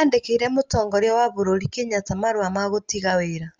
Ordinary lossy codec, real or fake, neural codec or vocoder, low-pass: Opus, 32 kbps; real; none; 7.2 kHz